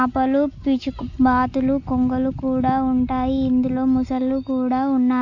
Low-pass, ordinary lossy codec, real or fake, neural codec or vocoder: 7.2 kHz; AAC, 48 kbps; real; none